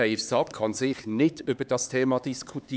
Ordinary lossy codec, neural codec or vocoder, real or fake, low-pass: none; codec, 16 kHz, 4 kbps, X-Codec, HuBERT features, trained on LibriSpeech; fake; none